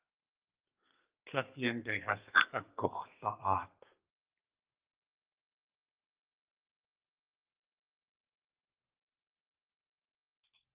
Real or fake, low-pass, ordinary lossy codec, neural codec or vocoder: fake; 3.6 kHz; Opus, 32 kbps; codec, 32 kHz, 1.9 kbps, SNAC